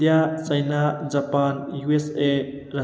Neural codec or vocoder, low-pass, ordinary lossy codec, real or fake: none; none; none; real